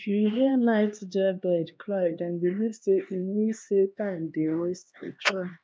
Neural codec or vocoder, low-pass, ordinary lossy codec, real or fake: codec, 16 kHz, 2 kbps, X-Codec, HuBERT features, trained on LibriSpeech; none; none; fake